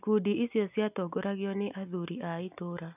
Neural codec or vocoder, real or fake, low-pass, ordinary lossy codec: none; real; 3.6 kHz; none